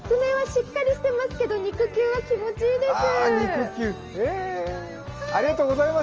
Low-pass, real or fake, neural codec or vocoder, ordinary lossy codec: 7.2 kHz; real; none; Opus, 24 kbps